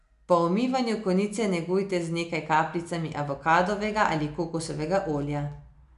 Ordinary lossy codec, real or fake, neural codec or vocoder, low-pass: MP3, 96 kbps; real; none; 10.8 kHz